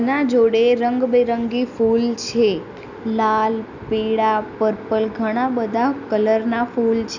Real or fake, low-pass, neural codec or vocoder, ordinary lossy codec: real; 7.2 kHz; none; none